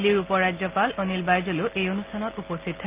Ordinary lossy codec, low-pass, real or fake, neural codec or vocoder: Opus, 32 kbps; 3.6 kHz; real; none